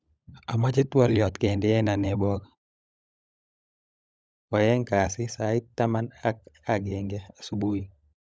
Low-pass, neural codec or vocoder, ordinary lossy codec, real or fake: none; codec, 16 kHz, 16 kbps, FunCodec, trained on LibriTTS, 50 frames a second; none; fake